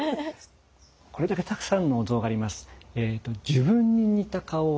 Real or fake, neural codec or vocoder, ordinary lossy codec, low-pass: real; none; none; none